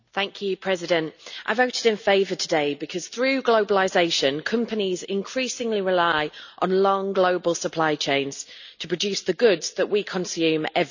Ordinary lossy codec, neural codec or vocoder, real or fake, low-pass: none; none; real; 7.2 kHz